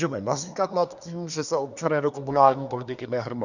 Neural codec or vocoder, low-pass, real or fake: codec, 24 kHz, 1 kbps, SNAC; 7.2 kHz; fake